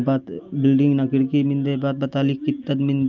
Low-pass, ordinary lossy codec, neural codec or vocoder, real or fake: 7.2 kHz; Opus, 24 kbps; none; real